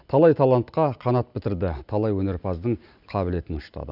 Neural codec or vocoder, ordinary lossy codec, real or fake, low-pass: none; none; real; 5.4 kHz